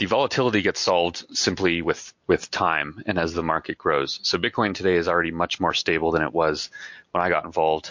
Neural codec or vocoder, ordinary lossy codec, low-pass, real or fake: none; MP3, 48 kbps; 7.2 kHz; real